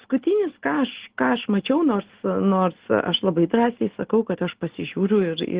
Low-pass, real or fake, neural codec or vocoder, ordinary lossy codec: 3.6 kHz; real; none; Opus, 16 kbps